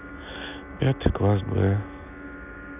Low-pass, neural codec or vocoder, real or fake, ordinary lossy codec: 3.6 kHz; none; real; none